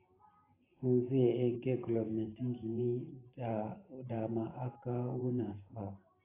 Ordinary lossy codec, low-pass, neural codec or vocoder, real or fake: AAC, 16 kbps; 3.6 kHz; vocoder, 44.1 kHz, 128 mel bands every 512 samples, BigVGAN v2; fake